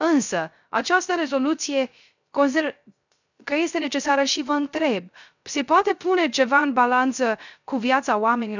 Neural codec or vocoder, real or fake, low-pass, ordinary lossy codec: codec, 16 kHz, 0.3 kbps, FocalCodec; fake; 7.2 kHz; none